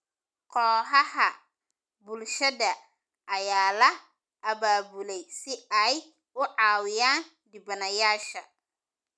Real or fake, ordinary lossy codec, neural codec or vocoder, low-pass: real; none; none; none